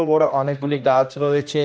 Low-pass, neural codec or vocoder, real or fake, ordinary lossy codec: none; codec, 16 kHz, 1 kbps, X-Codec, HuBERT features, trained on general audio; fake; none